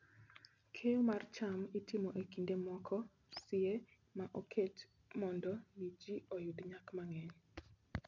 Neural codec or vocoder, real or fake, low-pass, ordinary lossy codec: none; real; 7.2 kHz; none